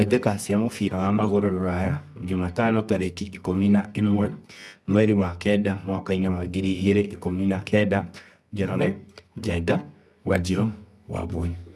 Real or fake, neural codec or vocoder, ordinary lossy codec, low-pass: fake; codec, 24 kHz, 0.9 kbps, WavTokenizer, medium music audio release; none; none